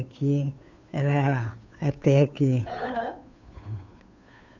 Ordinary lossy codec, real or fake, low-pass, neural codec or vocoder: none; fake; 7.2 kHz; codec, 16 kHz, 2 kbps, FunCodec, trained on Chinese and English, 25 frames a second